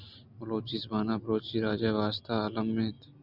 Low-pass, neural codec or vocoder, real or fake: 5.4 kHz; none; real